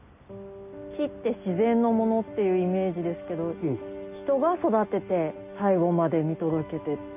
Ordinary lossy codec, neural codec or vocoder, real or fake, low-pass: none; none; real; 3.6 kHz